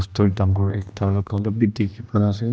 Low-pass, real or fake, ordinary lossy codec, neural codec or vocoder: none; fake; none; codec, 16 kHz, 1 kbps, X-Codec, HuBERT features, trained on general audio